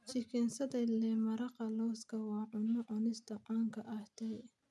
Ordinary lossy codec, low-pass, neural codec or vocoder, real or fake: none; none; none; real